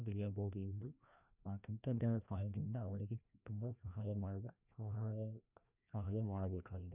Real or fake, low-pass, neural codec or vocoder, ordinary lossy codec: fake; 3.6 kHz; codec, 16 kHz, 1 kbps, FreqCodec, larger model; none